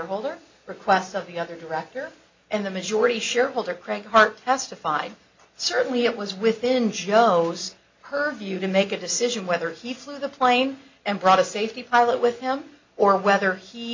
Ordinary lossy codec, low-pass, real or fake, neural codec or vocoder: MP3, 48 kbps; 7.2 kHz; real; none